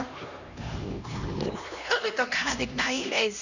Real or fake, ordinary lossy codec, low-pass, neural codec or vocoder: fake; none; 7.2 kHz; codec, 16 kHz, 1 kbps, X-Codec, HuBERT features, trained on LibriSpeech